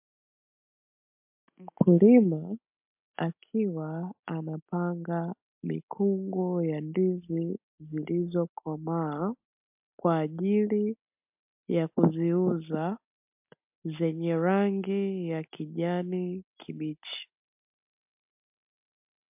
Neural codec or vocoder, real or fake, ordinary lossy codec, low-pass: none; real; MP3, 32 kbps; 3.6 kHz